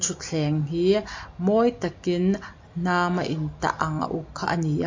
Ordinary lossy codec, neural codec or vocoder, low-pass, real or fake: MP3, 48 kbps; none; 7.2 kHz; real